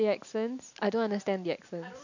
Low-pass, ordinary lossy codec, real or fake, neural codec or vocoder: 7.2 kHz; none; real; none